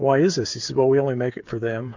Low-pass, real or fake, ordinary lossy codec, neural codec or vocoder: 7.2 kHz; fake; MP3, 48 kbps; codec, 16 kHz, 8 kbps, FreqCodec, smaller model